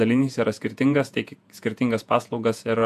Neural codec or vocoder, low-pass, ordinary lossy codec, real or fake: vocoder, 48 kHz, 128 mel bands, Vocos; 14.4 kHz; AAC, 96 kbps; fake